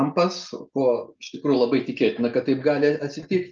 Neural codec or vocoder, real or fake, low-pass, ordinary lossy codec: none; real; 7.2 kHz; Opus, 24 kbps